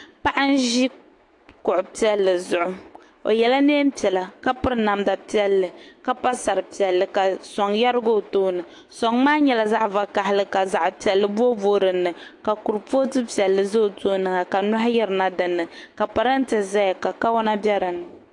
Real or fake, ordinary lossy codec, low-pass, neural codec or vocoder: fake; AAC, 48 kbps; 10.8 kHz; autoencoder, 48 kHz, 128 numbers a frame, DAC-VAE, trained on Japanese speech